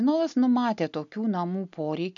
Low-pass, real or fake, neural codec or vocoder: 7.2 kHz; real; none